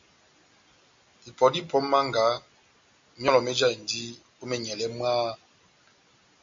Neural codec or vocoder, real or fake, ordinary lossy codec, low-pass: none; real; MP3, 48 kbps; 7.2 kHz